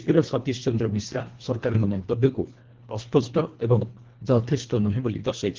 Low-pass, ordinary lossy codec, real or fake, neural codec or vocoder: 7.2 kHz; Opus, 16 kbps; fake; codec, 24 kHz, 1.5 kbps, HILCodec